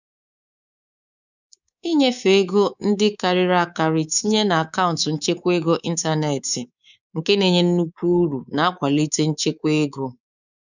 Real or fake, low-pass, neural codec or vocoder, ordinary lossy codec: fake; 7.2 kHz; codec, 24 kHz, 3.1 kbps, DualCodec; none